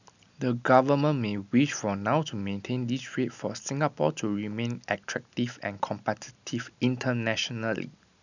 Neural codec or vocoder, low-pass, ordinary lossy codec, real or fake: none; 7.2 kHz; none; real